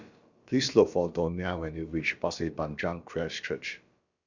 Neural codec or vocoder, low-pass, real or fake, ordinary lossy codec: codec, 16 kHz, about 1 kbps, DyCAST, with the encoder's durations; 7.2 kHz; fake; Opus, 64 kbps